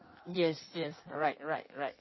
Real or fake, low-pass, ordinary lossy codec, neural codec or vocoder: fake; 7.2 kHz; MP3, 24 kbps; codec, 16 kHz in and 24 kHz out, 1.1 kbps, FireRedTTS-2 codec